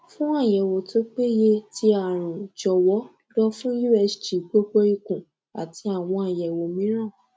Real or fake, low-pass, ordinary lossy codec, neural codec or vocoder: real; none; none; none